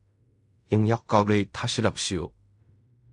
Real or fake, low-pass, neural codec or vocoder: fake; 10.8 kHz; codec, 16 kHz in and 24 kHz out, 0.4 kbps, LongCat-Audio-Codec, fine tuned four codebook decoder